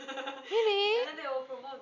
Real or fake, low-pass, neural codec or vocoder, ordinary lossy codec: real; 7.2 kHz; none; none